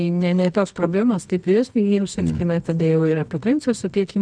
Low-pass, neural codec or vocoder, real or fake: 9.9 kHz; codec, 24 kHz, 0.9 kbps, WavTokenizer, medium music audio release; fake